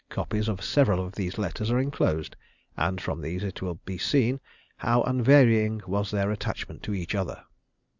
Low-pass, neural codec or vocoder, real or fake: 7.2 kHz; none; real